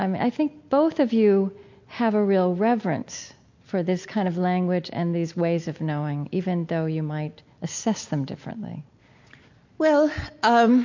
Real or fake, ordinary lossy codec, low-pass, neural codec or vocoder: real; MP3, 48 kbps; 7.2 kHz; none